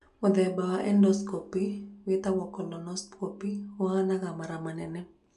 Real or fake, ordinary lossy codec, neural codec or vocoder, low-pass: real; none; none; 10.8 kHz